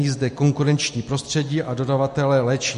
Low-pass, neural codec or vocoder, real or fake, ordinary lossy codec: 14.4 kHz; none; real; MP3, 48 kbps